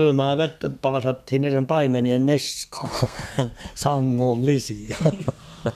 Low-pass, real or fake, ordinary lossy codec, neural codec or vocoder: 14.4 kHz; fake; none; codec, 32 kHz, 1.9 kbps, SNAC